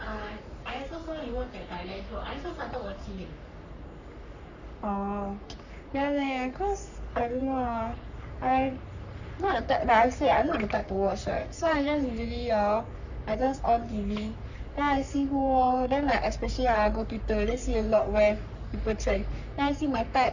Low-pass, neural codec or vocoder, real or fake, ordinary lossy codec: 7.2 kHz; codec, 44.1 kHz, 3.4 kbps, Pupu-Codec; fake; none